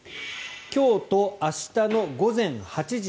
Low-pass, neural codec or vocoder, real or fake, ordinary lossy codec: none; none; real; none